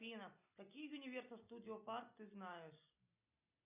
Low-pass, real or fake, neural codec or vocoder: 3.6 kHz; real; none